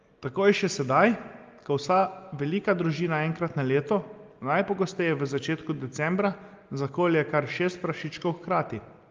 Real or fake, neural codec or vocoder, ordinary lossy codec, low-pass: real; none; Opus, 32 kbps; 7.2 kHz